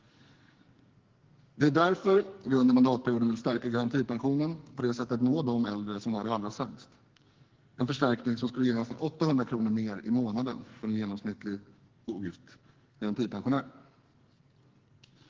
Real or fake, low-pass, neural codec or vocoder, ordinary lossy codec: fake; 7.2 kHz; codec, 44.1 kHz, 2.6 kbps, SNAC; Opus, 16 kbps